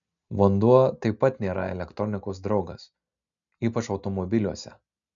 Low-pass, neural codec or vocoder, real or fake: 7.2 kHz; none; real